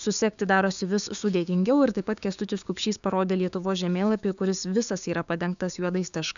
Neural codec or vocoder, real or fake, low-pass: codec, 16 kHz, 6 kbps, DAC; fake; 7.2 kHz